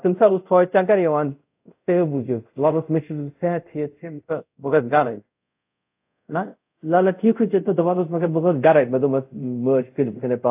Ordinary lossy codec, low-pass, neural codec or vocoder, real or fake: AAC, 32 kbps; 3.6 kHz; codec, 24 kHz, 0.5 kbps, DualCodec; fake